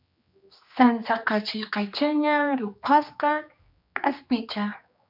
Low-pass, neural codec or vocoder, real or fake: 5.4 kHz; codec, 16 kHz, 2 kbps, X-Codec, HuBERT features, trained on general audio; fake